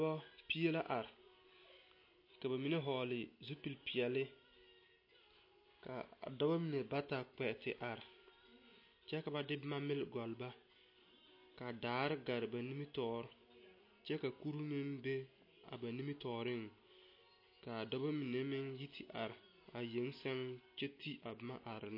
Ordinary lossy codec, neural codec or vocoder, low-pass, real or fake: MP3, 32 kbps; none; 5.4 kHz; real